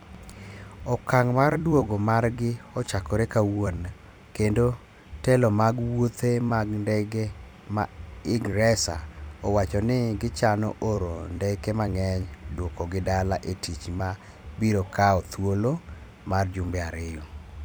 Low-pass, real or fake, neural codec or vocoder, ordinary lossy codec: none; fake; vocoder, 44.1 kHz, 128 mel bands every 256 samples, BigVGAN v2; none